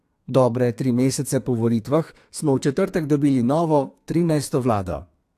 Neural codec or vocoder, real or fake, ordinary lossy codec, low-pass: codec, 32 kHz, 1.9 kbps, SNAC; fake; AAC, 64 kbps; 14.4 kHz